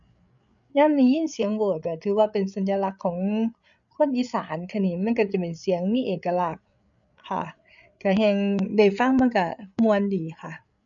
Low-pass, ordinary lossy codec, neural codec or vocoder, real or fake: 7.2 kHz; none; codec, 16 kHz, 8 kbps, FreqCodec, larger model; fake